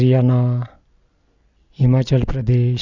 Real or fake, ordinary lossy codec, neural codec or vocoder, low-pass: real; Opus, 64 kbps; none; 7.2 kHz